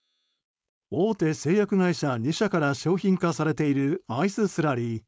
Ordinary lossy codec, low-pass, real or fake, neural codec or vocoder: none; none; fake; codec, 16 kHz, 4.8 kbps, FACodec